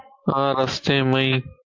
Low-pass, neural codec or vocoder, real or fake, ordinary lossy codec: 7.2 kHz; none; real; MP3, 48 kbps